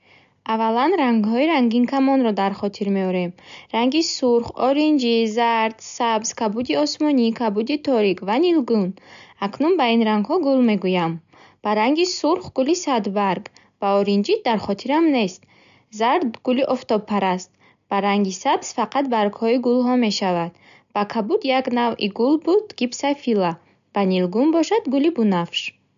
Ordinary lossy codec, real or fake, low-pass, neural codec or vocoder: AAC, 96 kbps; real; 7.2 kHz; none